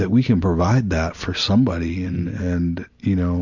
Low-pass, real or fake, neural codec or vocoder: 7.2 kHz; real; none